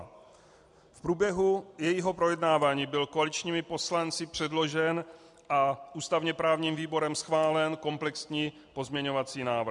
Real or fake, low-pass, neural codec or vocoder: real; 10.8 kHz; none